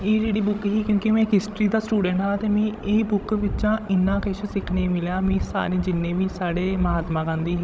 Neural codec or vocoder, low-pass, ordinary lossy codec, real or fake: codec, 16 kHz, 16 kbps, FreqCodec, larger model; none; none; fake